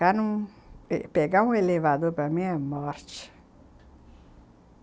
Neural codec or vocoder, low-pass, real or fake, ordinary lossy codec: none; none; real; none